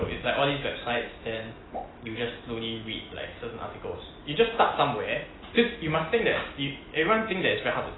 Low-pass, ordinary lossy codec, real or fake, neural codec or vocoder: 7.2 kHz; AAC, 16 kbps; real; none